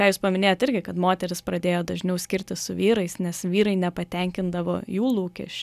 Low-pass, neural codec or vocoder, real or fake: 14.4 kHz; none; real